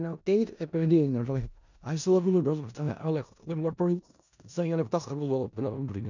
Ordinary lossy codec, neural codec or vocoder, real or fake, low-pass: none; codec, 16 kHz in and 24 kHz out, 0.4 kbps, LongCat-Audio-Codec, four codebook decoder; fake; 7.2 kHz